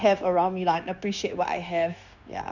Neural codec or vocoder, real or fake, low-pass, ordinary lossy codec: codec, 16 kHz, 0.9 kbps, LongCat-Audio-Codec; fake; 7.2 kHz; none